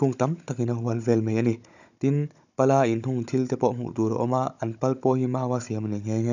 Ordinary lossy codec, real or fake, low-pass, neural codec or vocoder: none; fake; 7.2 kHz; codec, 16 kHz, 16 kbps, FunCodec, trained on Chinese and English, 50 frames a second